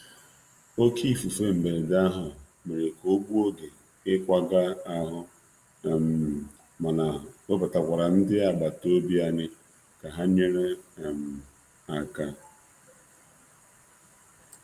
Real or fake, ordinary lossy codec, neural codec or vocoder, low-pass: real; Opus, 32 kbps; none; 14.4 kHz